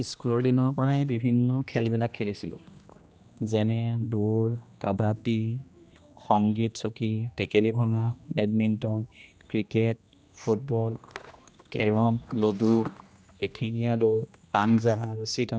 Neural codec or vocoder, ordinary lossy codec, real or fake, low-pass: codec, 16 kHz, 1 kbps, X-Codec, HuBERT features, trained on general audio; none; fake; none